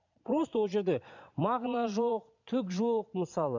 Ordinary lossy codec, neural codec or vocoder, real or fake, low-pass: none; vocoder, 22.05 kHz, 80 mel bands, Vocos; fake; 7.2 kHz